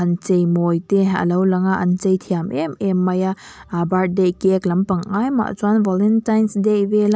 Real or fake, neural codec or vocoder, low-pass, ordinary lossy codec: real; none; none; none